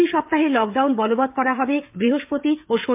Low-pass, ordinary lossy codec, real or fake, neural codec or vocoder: 3.6 kHz; MP3, 32 kbps; fake; codec, 16 kHz, 16 kbps, FreqCodec, smaller model